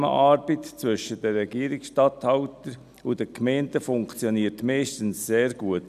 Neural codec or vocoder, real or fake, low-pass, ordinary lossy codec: none; real; 14.4 kHz; none